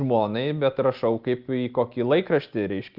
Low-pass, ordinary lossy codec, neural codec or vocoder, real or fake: 5.4 kHz; Opus, 32 kbps; none; real